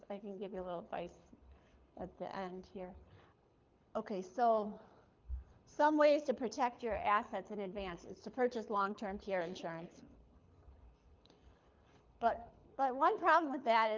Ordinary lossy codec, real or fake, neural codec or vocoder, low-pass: Opus, 24 kbps; fake; codec, 24 kHz, 6 kbps, HILCodec; 7.2 kHz